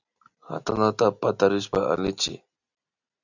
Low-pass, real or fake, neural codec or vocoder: 7.2 kHz; real; none